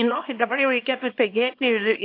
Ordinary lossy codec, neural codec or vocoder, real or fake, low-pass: AAC, 32 kbps; codec, 24 kHz, 0.9 kbps, WavTokenizer, small release; fake; 5.4 kHz